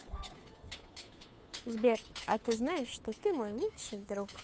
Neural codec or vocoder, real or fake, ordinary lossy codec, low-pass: codec, 16 kHz, 2 kbps, FunCodec, trained on Chinese and English, 25 frames a second; fake; none; none